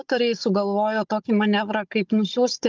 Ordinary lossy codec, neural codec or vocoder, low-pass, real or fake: Opus, 32 kbps; codec, 16 kHz, 16 kbps, FunCodec, trained on Chinese and English, 50 frames a second; 7.2 kHz; fake